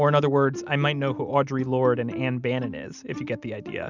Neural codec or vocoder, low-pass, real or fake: none; 7.2 kHz; real